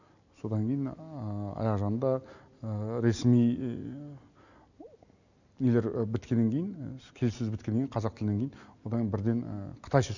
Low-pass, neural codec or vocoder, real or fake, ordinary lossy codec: 7.2 kHz; none; real; none